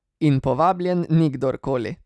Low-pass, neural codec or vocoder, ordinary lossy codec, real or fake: none; none; none; real